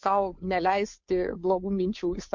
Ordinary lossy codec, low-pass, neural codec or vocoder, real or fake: MP3, 48 kbps; 7.2 kHz; codec, 16 kHz, 2 kbps, FunCodec, trained on Chinese and English, 25 frames a second; fake